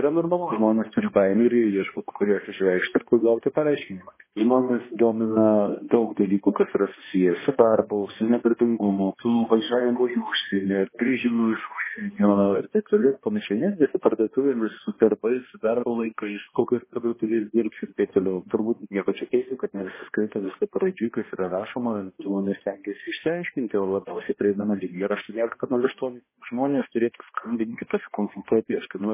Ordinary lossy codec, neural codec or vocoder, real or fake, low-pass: MP3, 16 kbps; codec, 16 kHz, 1 kbps, X-Codec, HuBERT features, trained on balanced general audio; fake; 3.6 kHz